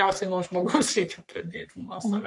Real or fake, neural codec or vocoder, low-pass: fake; codec, 44.1 kHz, 3.4 kbps, Pupu-Codec; 10.8 kHz